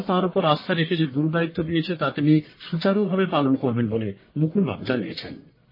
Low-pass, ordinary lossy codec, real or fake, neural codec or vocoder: 5.4 kHz; MP3, 24 kbps; fake; codec, 44.1 kHz, 1.7 kbps, Pupu-Codec